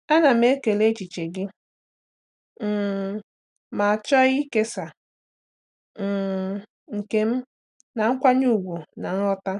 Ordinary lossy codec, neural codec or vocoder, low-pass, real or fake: none; none; 10.8 kHz; real